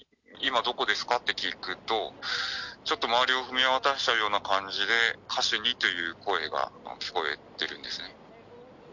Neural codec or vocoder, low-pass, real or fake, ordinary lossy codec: none; 7.2 kHz; real; none